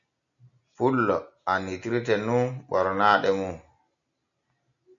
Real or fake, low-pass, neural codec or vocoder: real; 7.2 kHz; none